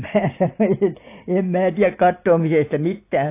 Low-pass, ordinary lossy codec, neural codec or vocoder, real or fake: 3.6 kHz; AAC, 24 kbps; none; real